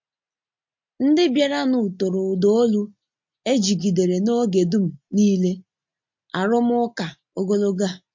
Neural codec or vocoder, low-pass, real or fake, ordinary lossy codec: none; 7.2 kHz; real; MP3, 48 kbps